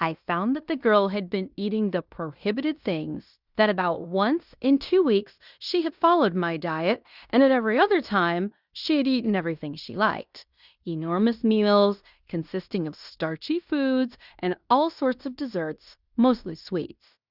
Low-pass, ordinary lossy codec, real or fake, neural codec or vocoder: 5.4 kHz; Opus, 64 kbps; fake; codec, 16 kHz in and 24 kHz out, 0.9 kbps, LongCat-Audio-Codec, fine tuned four codebook decoder